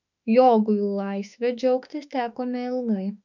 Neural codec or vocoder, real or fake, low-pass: autoencoder, 48 kHz, 32 numbers a frame, DAC-VAE, trained on Japanese speech; fake; 7.2 kHz